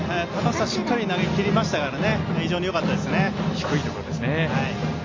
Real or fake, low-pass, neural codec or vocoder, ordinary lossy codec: real; 7.2 kHz; none; MP3, 32 kbps